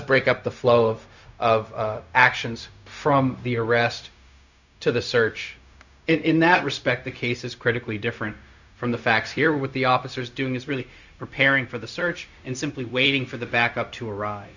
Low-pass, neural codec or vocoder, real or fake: 7.2 kHz; codec, 16 kHz, 0.4 kbps, LongCat-Audio-Codec; fake